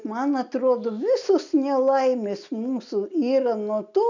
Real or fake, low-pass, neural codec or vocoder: real; 7.2 kHz; none